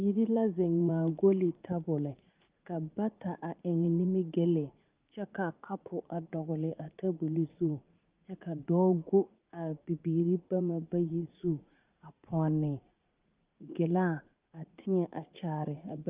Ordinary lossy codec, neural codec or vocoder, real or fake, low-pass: Opus, 32 kbps; vocoder, 44.1 kHz, 80 mel bands, Vocos; fake; 3.6 kHz